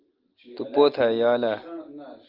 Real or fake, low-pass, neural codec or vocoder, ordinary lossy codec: real; 5.4 kHz; none; Opus, 32 kbps